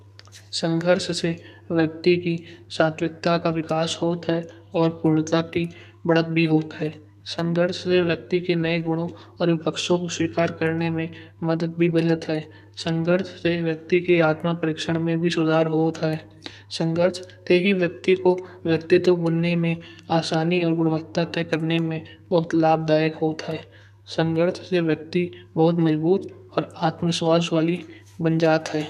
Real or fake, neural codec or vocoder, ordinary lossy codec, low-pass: fake; codec, 32 kHz, 1.9 kbps, SNAC; none; 14.4 kHz